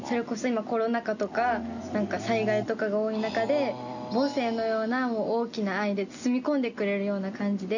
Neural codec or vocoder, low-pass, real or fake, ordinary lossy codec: none; 7.2 kHz; real; none